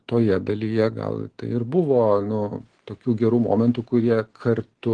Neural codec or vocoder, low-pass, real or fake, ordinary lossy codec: none; 9.9 kHz; real; Opus, 16 kbps